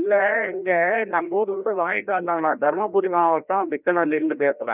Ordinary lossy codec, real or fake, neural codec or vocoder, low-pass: none; fake; codec, 16 kHz, 1 kbps, FreqCodec, larger model; 3.6 kHz